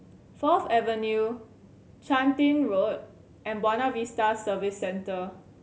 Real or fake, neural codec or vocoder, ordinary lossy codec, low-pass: real; none; none; none